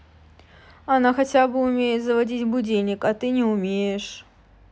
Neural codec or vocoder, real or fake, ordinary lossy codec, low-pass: none; real; none; none